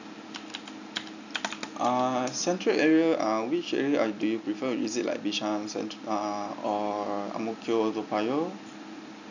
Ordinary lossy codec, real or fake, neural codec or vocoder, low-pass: none; real; none; 7.2 kHz